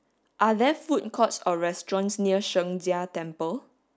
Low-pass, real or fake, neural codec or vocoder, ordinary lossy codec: none; real; none; none